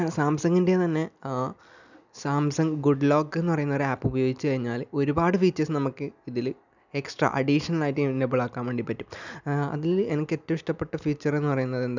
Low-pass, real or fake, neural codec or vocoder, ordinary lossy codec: 7.2 kHz; real; none; none